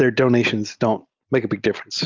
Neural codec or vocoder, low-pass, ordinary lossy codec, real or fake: none; 7.2 kHz; Opus, 32 kbps; real